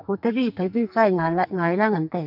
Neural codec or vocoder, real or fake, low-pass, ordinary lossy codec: codec, 44.1 kHz, 2.6 kbps, SNAC; fake; 5.4 kHz; none